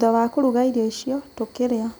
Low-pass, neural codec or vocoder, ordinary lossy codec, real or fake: none; none; none; real